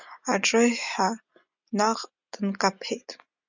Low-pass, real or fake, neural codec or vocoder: 7.2 kHz; real; none